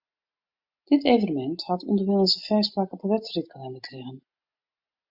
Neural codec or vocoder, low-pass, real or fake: none; 5.4 kHz; real